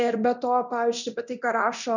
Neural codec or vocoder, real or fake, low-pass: codec, 24 kHz, 0.9 kbps, DualCodec; fake; 7.2 kHz